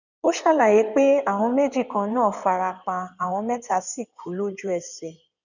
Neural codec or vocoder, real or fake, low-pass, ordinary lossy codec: codec, 16 kHz in and 24 kHz out, 2.2 kbps, FireRedTTS-2 codec; fake; 7.2 kHz; none